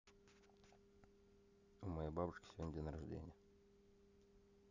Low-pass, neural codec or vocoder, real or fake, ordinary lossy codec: 7.2 kHz; none; real; none